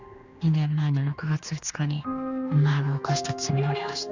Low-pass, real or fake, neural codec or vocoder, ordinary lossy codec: 7.2 kHz; fake; codec, 16 kHz, 2 kbps, X-Codec, HuBERT features, trained on general audio; Opus, 64 kbps